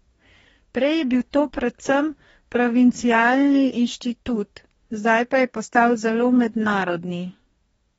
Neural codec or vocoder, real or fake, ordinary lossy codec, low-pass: codec, 44.1 kHz, 2.6 kbps, DAC; fake; AAC, 24 kbps; 19.8 kHz